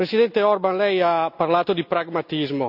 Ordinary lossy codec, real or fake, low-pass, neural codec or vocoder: none; real; 5.4 kHz; none